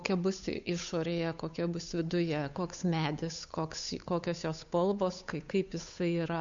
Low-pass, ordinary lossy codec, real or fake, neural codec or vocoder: 7.2 kHz; MP3, 64 kbps; fake; codec, 16 kHz, 8 kbps, FunCodec, trained on Chinese and English, 25 frames a second